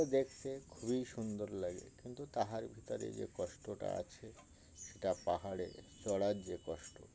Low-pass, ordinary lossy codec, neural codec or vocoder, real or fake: none; none; none; real